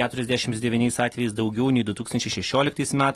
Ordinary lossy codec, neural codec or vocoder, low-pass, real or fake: AAC, 32 kbps; none; 19.8 kHz; real